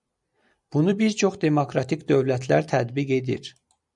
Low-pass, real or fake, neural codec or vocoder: 10.8 kHz; fake; vocoder, 44.1 kHz, 128 mel bands every 256 samples, BigVGAN v2